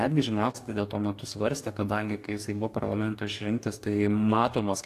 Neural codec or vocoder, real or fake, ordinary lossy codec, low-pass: codec, 44.1 kHz, 2.6 kbps, DAC; fake; AAC, 64 kbps; 14.4 kHz